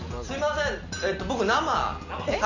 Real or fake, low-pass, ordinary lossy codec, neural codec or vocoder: real; 7.2 kHz; none; none